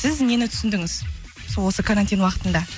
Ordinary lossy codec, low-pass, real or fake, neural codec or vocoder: none; none; real; none